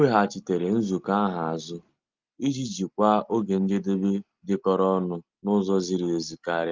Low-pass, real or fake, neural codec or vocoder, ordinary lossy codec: 7.2 kHz; real; none; Opus, 24 kbps